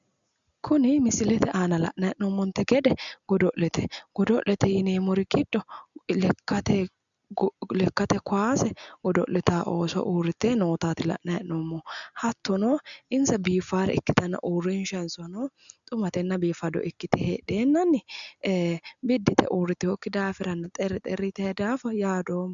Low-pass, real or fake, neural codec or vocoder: 7.2 kHz; real; none